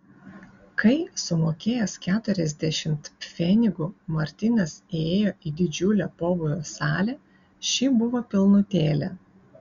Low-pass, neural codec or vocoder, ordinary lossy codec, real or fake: 7.2 kHz; none; Opus, 64 kbps; real